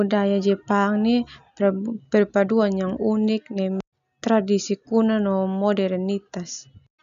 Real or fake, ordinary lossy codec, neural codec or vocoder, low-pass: real; none; none; 7.2 kHz